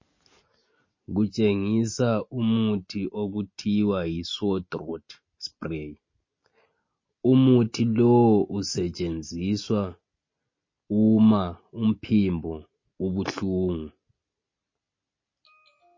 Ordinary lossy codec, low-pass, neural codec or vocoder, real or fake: MP3, 32 kbps; 7.2 kHz; none; real